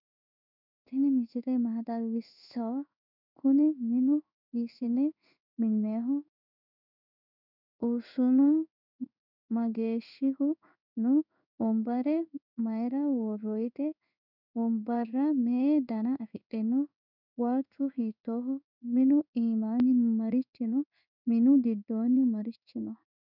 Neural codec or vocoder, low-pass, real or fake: codec, 16 kHz in and 24 kHz out, 1 kbps, XY-Tokenizer; 5.4 kHz; fake